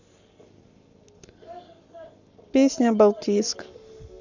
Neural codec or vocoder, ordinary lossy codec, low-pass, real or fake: codec, 44.1 kHz, 7.8 kbps, Pupu-Codec; none; 7.2 kHz; fake